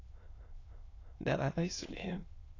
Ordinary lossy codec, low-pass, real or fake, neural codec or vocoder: AAC, 32 kbps; 7.2 kHz; fake; autoencoder, 22.05 kHz, a latent of 192 numbers a frame, VITS, trained on many speakers